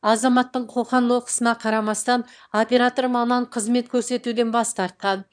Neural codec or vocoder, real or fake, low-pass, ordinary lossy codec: autoencoder, 22.05 kHz, a latent of 192 numbers a frame, VITS, trained on one speaker; fake; 9.9 kHz; none